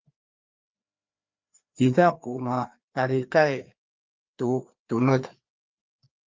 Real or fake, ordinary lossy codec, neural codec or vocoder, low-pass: fake; Opus, 32 kbps; codec, 16 kHz, 2 kbps, FreqCodec, larger model; 7.2 kHz